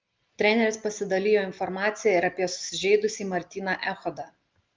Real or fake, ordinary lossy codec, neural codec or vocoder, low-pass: real; Opus, 24 kbps; none; 7.2 kHz